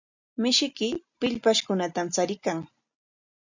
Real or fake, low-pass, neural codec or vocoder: real; 7.2 kHz; none